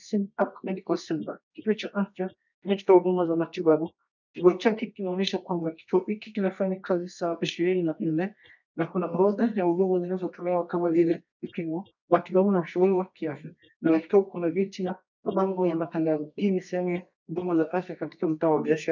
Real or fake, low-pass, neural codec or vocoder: fake; 7.2 kHz; codec, 24 kHz, 0.9 kbps, WavTokenizer, medium music audio release